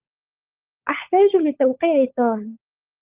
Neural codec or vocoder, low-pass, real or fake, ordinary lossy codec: codec, 16 kHz, 16 kbps, FunCodec, trained on LibriTTS, 50 frames a second; 3.6 kHz; fake; Opus, 24 kbps